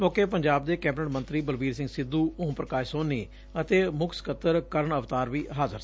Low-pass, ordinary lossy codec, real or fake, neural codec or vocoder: none; none; real; none